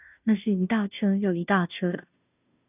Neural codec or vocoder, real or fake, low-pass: codec, 16 kHz, 0.5 kbps, FunCodec, trained on Chinese and English, 25 frames a second; fake; 3.6 kHz